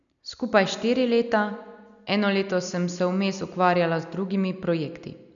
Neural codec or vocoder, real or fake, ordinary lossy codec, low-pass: none; real; none; 7.2 kHz